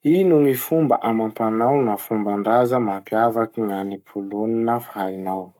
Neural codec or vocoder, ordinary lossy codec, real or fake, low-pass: codec, 44.1 kHz, 7.8 kbps, Pupu-Codec; none; fake; 19.8 kHz